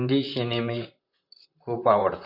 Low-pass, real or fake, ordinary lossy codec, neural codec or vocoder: 5.4 kHz; fake; none; vocoder, 44.1 kHz, 128 mel bands, Pupu-Vocoder